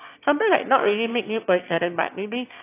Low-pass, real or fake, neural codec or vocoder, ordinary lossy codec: 3.6 kHz; fake; autoencoder, 22.05 kHz, a latent of 192 numbers a frame, VITS, trained on one speaker; AAC, 24 kbps